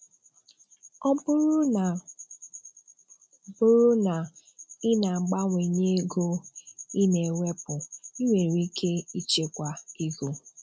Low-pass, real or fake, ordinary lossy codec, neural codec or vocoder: none; real; none; none